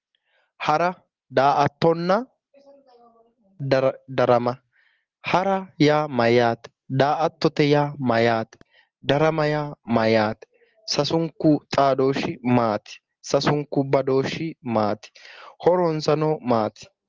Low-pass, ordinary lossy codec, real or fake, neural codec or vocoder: 7.2 kHz; Opus, 16 kbps; real; none